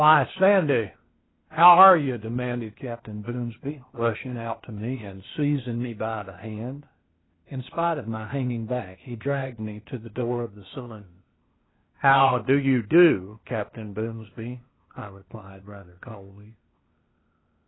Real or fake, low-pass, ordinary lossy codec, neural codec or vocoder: fake; 7.2 kHz; AAC, 16 kbps; codec, 16 kHz, 0.8 kbps, ZipCodec